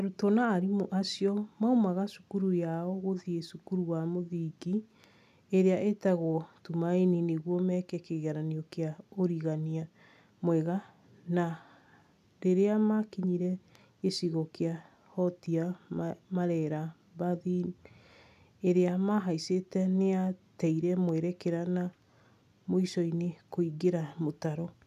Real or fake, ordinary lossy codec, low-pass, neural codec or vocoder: real; none; 14.4 kHz; none